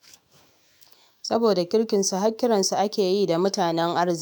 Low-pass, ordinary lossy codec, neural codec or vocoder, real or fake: none; none; autoencoder, 48 kHz, 128 numbers a frame, DAC-VAE, trained on Japanese speech; fake